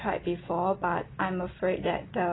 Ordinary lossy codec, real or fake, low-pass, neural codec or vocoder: AAC, 16 kbps; real; 7.2 kHz; none